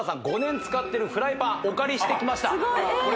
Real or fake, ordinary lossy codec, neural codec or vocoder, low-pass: real; none; none; none